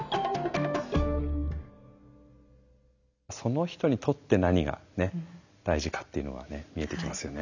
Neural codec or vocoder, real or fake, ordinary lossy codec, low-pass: none; real; none; 7.2 kHz